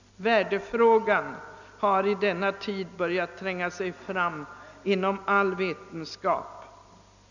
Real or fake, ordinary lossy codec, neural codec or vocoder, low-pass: real; none; none; 7.2 kHz